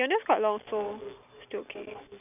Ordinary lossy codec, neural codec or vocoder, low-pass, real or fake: none; none; 3.6 kHz; real